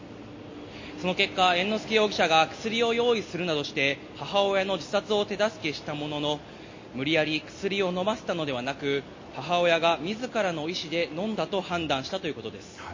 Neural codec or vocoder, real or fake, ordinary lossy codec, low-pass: none; real; MP3, 32 kbps; 7.2 kHz